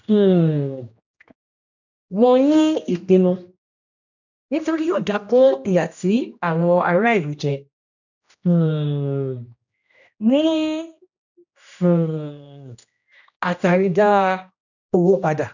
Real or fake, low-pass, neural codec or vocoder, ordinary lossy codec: fake; 7.2 kHz; codec, 16 kHz, 1 kbps, X-Codec, HuBERT features, trained on general audio; none